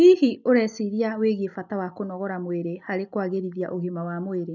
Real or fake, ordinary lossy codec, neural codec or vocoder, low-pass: real; none; none; 7.2 kHz